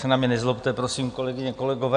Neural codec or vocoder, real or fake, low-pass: none; real; 9.9 kHz